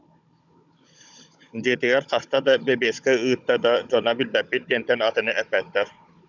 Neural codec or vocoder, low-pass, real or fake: codec, 16 kHz, 16 kbps, FunCodec, trained on Chinese and English, 50 frames a second; 7.2 kHz; fake